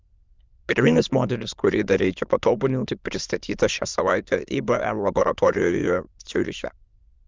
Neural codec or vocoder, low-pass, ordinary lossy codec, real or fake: autoencoder, 22.05 kHz, a latent of 192 numbers a frame, VITS, trained on many speakers; 7.2 kHz; Opus, 24 kbps; fake